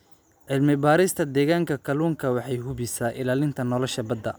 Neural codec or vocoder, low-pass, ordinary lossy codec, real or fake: none; none; none; real